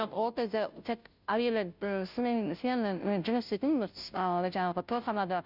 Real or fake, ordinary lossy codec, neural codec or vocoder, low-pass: fake; none; codec, 16 kHz, 0.5 kbps, FunCodec, trained on Chinese and English, 25 frames a second; 5.4 kHz